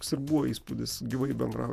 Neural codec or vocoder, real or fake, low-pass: vocoder, 48 kHz, 128 mel bands, Vocos; fake; 14.4 kHz